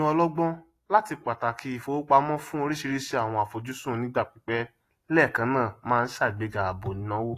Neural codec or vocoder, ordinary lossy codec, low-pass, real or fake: none; AAC, 48 kbps; 14.4 kHz; real